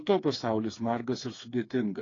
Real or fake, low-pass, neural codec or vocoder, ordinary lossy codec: fake; 7.2 kHz; codec, 16 kHz, 4 kbps, FreqCodec, smaller model; AAC, 32 kbps